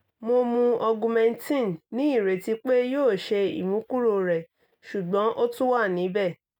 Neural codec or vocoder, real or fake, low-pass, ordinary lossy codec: none; real; 19.8 kHz; none